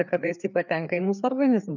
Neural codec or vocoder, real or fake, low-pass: codec, 16 kHz, 4 kbps, FreqCodec, larger model; fake; 7.2 kHz